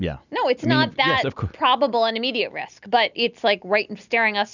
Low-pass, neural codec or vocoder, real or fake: 7.2 kHz; none; real